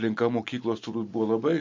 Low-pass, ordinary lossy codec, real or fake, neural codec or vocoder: 7.2 kHz; MP3, 48 kbps; real; none